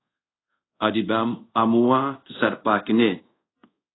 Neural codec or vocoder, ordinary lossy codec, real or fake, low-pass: codec, 24 kHz, 0.5 kbps, DualCodec; AAC, 16 kbps; fake; 7.2 kHz